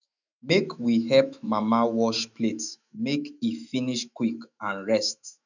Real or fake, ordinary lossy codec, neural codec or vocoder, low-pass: fake; none; autoencoder, 48 kHz, 128 numbers a frame, DAC-VAE, trained on Japanese speech; 7.2 kHz